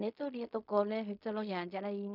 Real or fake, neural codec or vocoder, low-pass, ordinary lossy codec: fake; codec, 16 kHz in and 24 kHz out, 0.4 kbps, LongCat-Audio-Codec, fine tuned four codebook decoder; 5.4 kHz; none